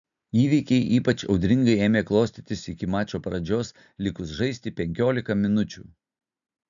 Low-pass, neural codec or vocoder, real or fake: 7.2 kHz; none; real